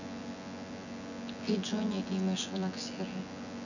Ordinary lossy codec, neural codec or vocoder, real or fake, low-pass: none; vocoder, 24 kHz, 100 mel bands, Vocos; fake; 7.2 kHz